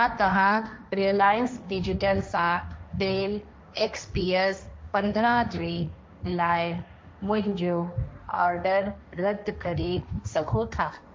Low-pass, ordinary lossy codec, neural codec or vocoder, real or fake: none; none; codec, 16 kHz, 1.1 kbps, Voila-Tokenizer; fake